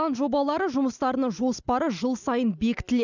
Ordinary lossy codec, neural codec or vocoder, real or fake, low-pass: none; none; real; 7.2 kHz